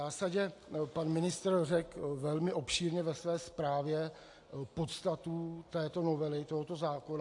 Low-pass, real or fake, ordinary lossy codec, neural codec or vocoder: 10.8 kHz; real; AAC, 48 kbps; none